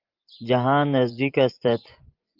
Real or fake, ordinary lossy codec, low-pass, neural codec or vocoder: fake; Opus, 32 kbps; 5.4 kHz; vocoder, 24 kHz, 100 mel bands, Vocos